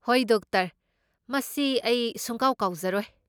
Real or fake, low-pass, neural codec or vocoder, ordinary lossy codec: real; none; none; none